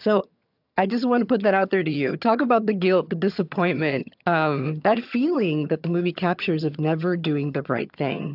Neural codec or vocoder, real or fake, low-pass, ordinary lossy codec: vocoder, 22.05 kHz, 80 mel bands, HiFi-GAN; fake; 5.4 kHz; AAC, 48 kbps